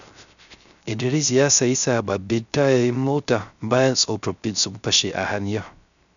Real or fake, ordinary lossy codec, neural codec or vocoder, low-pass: fake; none; codec, 16 kHz, 0.3 kbps, FocalCodec; 7.2 kHz